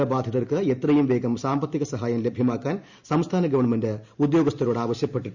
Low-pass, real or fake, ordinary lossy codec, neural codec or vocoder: 7.2 kHz; real; Opus, 64 kbps; none